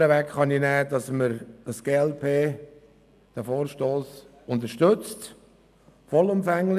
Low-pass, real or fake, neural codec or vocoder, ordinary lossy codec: 14.4 kHz; real; none; none